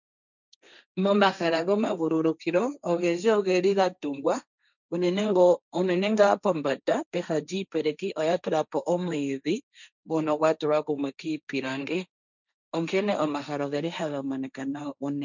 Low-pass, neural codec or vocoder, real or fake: 7.2 kHz; codec, 16 kHz, 1.1 kbps, Voila-Tokenizer; fake